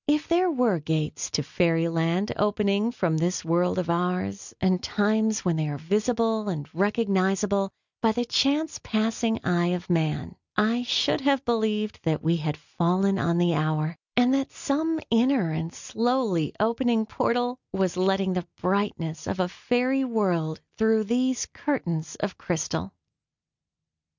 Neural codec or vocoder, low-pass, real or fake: none; 7.2 kHz; real